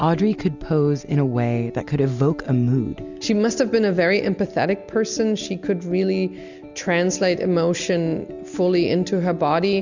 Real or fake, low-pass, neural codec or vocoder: real; 7.2 kHz; none